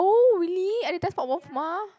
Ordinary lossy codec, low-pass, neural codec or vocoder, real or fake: none; none; none; real